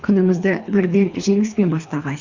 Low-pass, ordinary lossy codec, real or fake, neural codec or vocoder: 7.2 kHz; Opus, 64 kbps; fake; codec, 24 kHz, 3 kbps, HILCodec